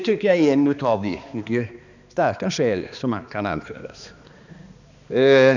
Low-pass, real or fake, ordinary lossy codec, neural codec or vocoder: 7.2 kHz; fake; none; codec, 16 kHz, 2 kbps, X-Codec, HuBERT features, trained on balanced general audio